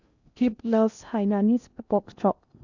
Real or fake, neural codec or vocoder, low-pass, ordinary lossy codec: fake; codec, 16 kHz in and 24 kHz out, 0.6 kbps, FocalCodec, streaming, 2048 codes; 7.2 kHz; none